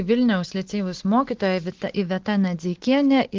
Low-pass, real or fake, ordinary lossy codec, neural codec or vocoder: 7.2 kHz; real; Opus, 16 kbps; none